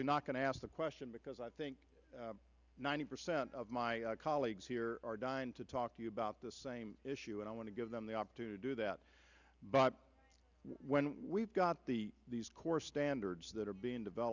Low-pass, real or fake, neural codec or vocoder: 7.2 kHz; real; none